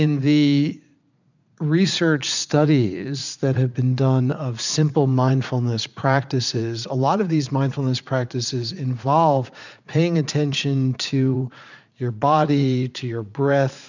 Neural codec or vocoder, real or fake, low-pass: vocoder, 44.1 kHz, 80 mel bands, Vocos; fake; 7.2 kHz